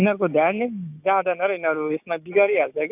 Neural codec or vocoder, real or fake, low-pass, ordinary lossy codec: codec, 16 kHz, 6 kbps, DAC; fake; 3.6 kHz; AAC, 32 kbps